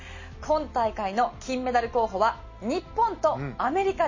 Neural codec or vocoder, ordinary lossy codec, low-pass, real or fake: none; MP3, 32 kbps; 7.2 kHz; real